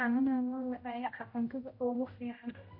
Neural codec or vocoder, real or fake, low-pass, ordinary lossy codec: codec, 16 kHz, 0.5 kbps, X-Codec, HuBERT features, trained on general audio; fake; 5.4 kHz; MP3, 32 kbps